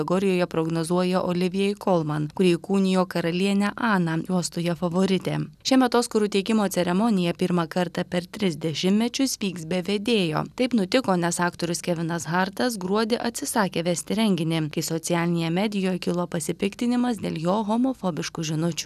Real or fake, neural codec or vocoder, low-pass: real; none; 14.4 kHz